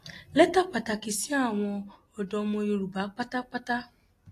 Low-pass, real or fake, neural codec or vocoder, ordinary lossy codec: 14.4 kHz; real; none; AAC, 48 kbps